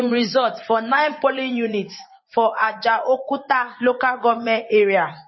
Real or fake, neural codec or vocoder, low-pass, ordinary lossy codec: fake; vocoder, 24 kHz, 100 mel bands, Vocos; 7.2 kHz; MP3, 24 kbps